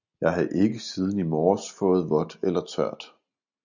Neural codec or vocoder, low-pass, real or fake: none; 7.2 kHz; real